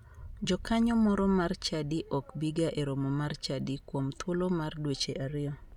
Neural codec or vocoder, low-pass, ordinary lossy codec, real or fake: none; 19.8 kHz; none; real